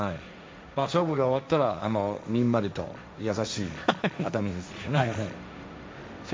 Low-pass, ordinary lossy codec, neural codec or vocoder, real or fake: none; none; codec, 16 kHz, 1.1 kbps, Voila-Tokenizer; fake